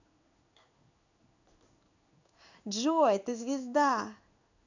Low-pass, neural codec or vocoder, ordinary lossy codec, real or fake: 7.2 kHz; codec, 16 kHz in and 24 kHz out, 1 kbps, XY-Tokenizer; none; fake